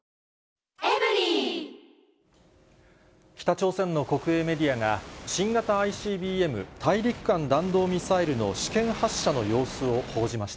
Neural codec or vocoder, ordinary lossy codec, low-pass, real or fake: none; none; none; real